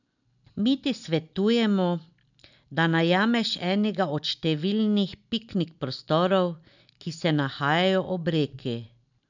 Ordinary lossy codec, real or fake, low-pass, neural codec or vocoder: none; real; 7.2 kHz; none